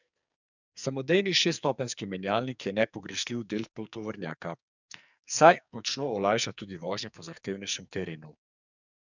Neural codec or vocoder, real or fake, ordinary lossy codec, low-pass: codec, 44.1 kHz, 2.6 kbps, SNAC; fake; none; 7.2 kHz